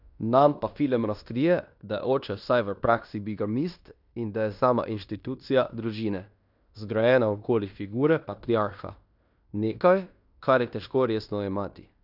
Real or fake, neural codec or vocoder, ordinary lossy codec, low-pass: fake; codec, 16 kHz in and 24 kHz out, 0.9 kbps, LongCat-Audio-Codec, fine tuned four codebook decoder; none; 5.4 kHz